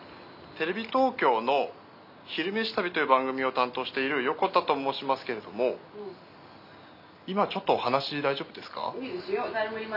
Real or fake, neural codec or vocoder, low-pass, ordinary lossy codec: real; none; 5.4 kHz; none